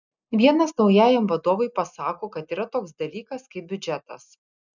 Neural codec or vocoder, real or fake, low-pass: none; real; 7.2 kHz